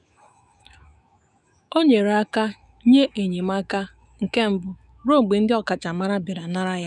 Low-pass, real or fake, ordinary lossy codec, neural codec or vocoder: 10.8 kHz; fake; none; autoencoder, 48 kHz, 128 numbers a frame, DAC-VAE, trained on Japanese speech